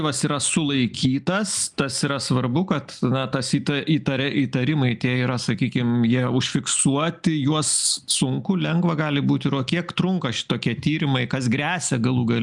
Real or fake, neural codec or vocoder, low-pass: real; none; 10.8 kHz